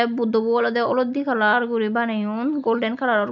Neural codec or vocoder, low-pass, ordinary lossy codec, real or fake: none; 7.2 kHz; none; real